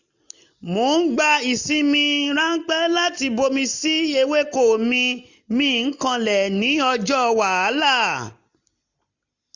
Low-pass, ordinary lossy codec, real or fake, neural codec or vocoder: 7.2 kHz; none; real; none